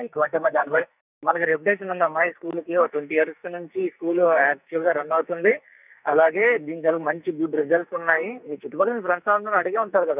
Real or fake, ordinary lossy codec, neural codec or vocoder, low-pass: fake; none; codec, 44.1 kHz, 2.6 kbps, SNAC; 3.6 kHz